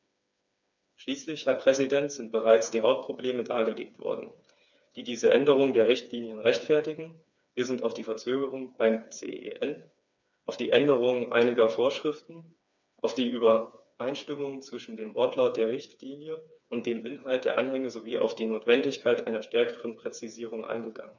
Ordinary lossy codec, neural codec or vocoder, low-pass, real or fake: none; codec, 16 kHz, 4 kbps, FreqCodec, smaller model; 7.2 kHz; fake